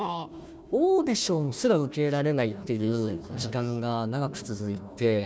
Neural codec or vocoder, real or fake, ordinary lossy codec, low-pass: codec, 16 kHz, 1 kbps, FunCodec, trained on Chinese and English, 50 frames a second; fake; none; none